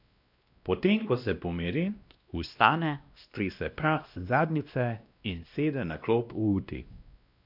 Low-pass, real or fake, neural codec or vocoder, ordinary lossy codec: 5.4 kHz; fake; codec, 16 kHz, 1 kbps, X-Codec, WavLM features, trained on Multilingual LibriSpeech; none